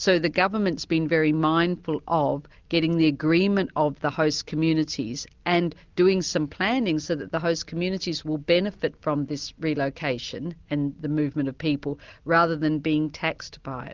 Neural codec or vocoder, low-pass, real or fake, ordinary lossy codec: none; 7.2 kHz; real; Opus, 32 kbps